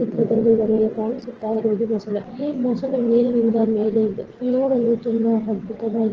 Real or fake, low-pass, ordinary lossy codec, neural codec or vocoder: fake; 7.2 kHz; Opus, 16 kbps; vocoder, 22.05 kHz, 80 mel bands, WaveNeXt